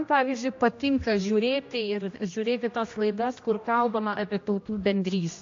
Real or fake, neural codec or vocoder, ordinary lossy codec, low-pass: fake; codec, 16 kHz, 1 kbps, X-Codec, HuBERT features, trained on general audio; AAC, 48 kbps; 7.2 kHz